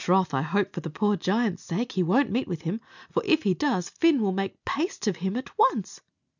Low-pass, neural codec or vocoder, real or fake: 7.2 kHz; none; real